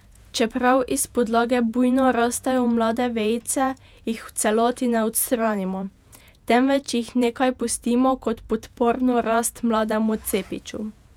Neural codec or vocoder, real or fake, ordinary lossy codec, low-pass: vocoder, 48 kHz, 128 mel bands, Vocos; fake; none; 19.8 kHz